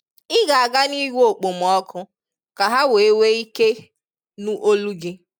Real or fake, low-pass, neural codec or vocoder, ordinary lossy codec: real; 19.8 kHz; none; none